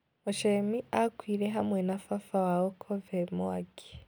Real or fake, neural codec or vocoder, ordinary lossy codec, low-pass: real; none; none; none